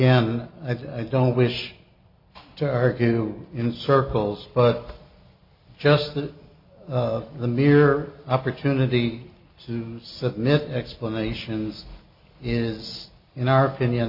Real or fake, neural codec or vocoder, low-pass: real; none; 5.4 kHz